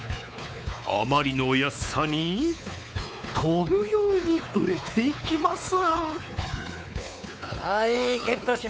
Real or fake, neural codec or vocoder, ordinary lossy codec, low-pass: fake; codec, 16 kHz, 4 kbps, X-Codec, WavLM features, trained on Multilingual LibriSpeech; none; none